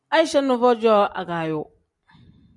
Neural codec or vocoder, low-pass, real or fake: none; 10.8 kHz; real